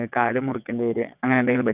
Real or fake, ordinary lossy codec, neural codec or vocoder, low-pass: fake; none; vocoder, 22.05 kHz, 80 mel bands, WaveNeXt; 3.6 kHz